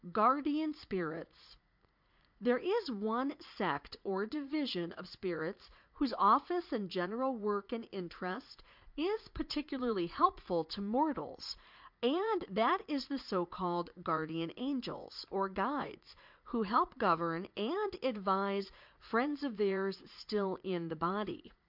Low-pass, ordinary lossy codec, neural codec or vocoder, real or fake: 5.4 kHz; MP3, 48 kbps; vocoder, 44.1 kHz, 80 mel bands, Vocos; fake